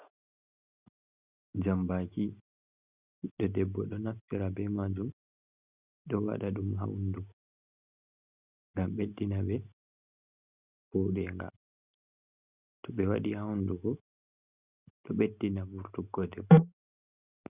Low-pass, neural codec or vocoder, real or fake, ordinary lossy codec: 3.6 kHz; none; real; Opus, 64 kbps